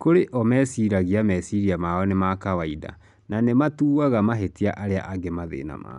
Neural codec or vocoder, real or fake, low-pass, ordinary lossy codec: none; real; 10.8 kHz; none